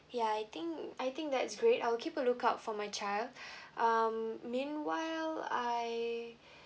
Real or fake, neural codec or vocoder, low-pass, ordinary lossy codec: real; none; none; none